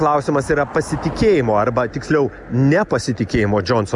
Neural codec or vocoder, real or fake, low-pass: none; real; 10.8 kHz